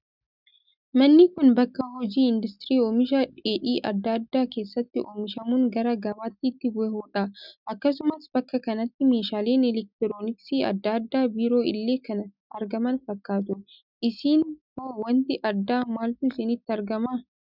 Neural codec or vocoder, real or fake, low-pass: none; real; 5.4 kHz